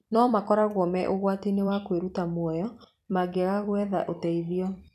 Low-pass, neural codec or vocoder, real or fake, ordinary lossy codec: 14.4 kHz; vocoder, 44.1 kHz, 128 mel bands every 256 samples, BigVGAN v2; fake; none